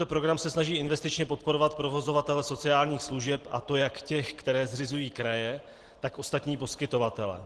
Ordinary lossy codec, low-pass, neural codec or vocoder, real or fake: Opus, 16 kbps; 10.8 kHz; none; real